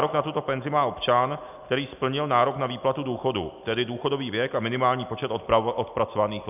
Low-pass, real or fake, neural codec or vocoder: 3.6 kHz; real; none